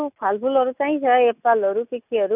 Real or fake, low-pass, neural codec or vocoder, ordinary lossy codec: real; 3.6 kHz; none; none